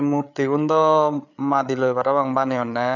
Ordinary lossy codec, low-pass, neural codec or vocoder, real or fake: none; 7.2 kHz; codec, 16 kHz, 8 kbps, FreqCodec, larger model; fake